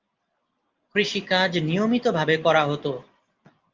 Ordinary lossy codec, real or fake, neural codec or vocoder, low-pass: Opus, 32 kbps; real; none; 7.2 kHz